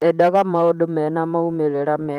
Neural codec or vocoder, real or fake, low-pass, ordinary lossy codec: vocoder, 44.1 kHz, 128 mel bands, Pupu-Vocoder; fake; 19.8 kHz; Opus, 32 kbps